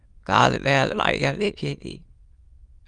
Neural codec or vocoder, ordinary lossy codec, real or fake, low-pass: autoencoder, 22.05 kHz, a latent of 192 numbers a frame, VITS, trained on many speakers; Opus, 32 kbps; fake; 9.9 kHz